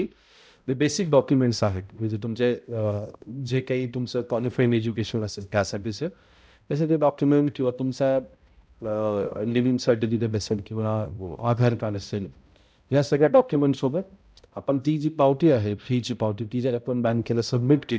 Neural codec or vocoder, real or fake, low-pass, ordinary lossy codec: codec, 16 kHz, 0.5 kbps, X-Codec, HuBERT features, trained on balanced general audio; fake; none; none